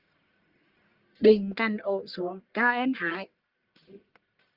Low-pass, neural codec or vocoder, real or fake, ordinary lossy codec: 5.4 kHz; codec, 44.1 kHz, 1.7 kbps, Pupu-Codec; fake; Opus, 24 kbps